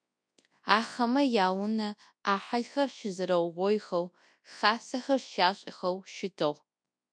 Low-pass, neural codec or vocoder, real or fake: 9.9 kHz; codec, 24 kHz, 0.9 kbps, WavTokenizer, large speech release; fake